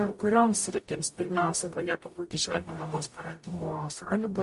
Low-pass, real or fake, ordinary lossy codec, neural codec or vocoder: 14.4 kHz; fake; MP3, 48 kbps; codec, 44.1 kHz, 0.9 kbps, DAC